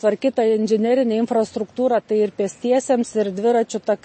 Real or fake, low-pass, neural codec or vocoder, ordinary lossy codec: real; 9.9 kHz; none; MP3, 32 kbps